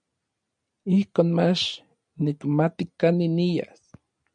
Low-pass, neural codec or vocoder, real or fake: 9.9 kHz; none; real